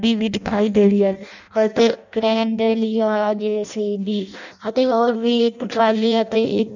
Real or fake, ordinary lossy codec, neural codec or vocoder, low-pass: fake; none; codec, 16 kHz in and 24 kHz out, 0.6 kbps, FireRedTTS-2 codec; 7.2 kHz